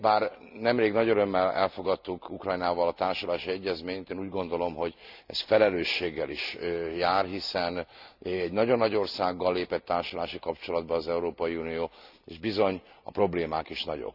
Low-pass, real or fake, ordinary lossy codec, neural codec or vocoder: 5.4 kHz; real; none; none